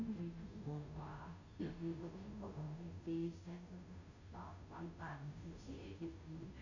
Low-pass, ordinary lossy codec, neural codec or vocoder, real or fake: 7.2 kHz; none; codec, 16 kHz, 0.5 kbps, FunCodec, trained on Chinese and English, 25 frames a second; fake